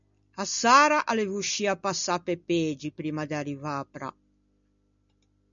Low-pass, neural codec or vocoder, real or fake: 7.2 kHz; none; real